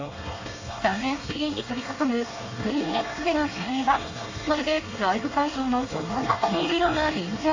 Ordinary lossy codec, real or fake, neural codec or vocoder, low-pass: AAC, 48 kbps; fake; codec, 24 kHz, 1 kbps, SNAC; 7.2 kHz